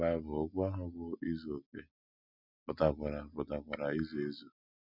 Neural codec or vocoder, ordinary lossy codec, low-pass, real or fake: none; none; 5.4 kHz; real